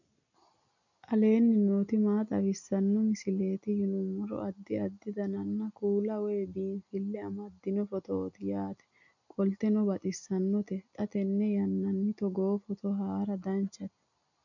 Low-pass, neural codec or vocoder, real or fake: 7.2 kHz; none; real